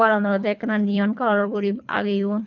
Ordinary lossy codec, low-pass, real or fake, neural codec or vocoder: none; 7.2 kHz; fake; codec, 24 kHz, 3 kbps, HILCodec